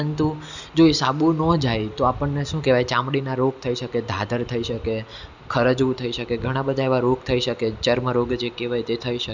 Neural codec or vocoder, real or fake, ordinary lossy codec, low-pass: none; real; none; 7.2 kHz